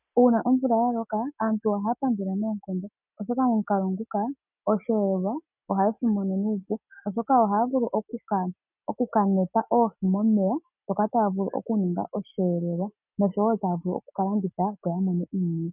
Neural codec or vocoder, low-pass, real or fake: none; 3.6 kHz; real